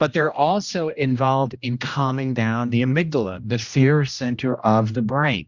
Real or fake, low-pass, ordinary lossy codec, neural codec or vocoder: fake; 7.2 kHz; Opus, 64 kbps; codec, 16 kHz, 1 kbps, X-Codec, HuBERT features, trained on general audio